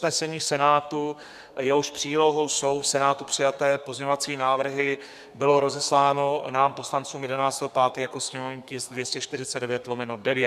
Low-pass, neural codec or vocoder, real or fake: 14.4 kHz; codec, 32 kHz, 1.9 kbps, SNAC; fake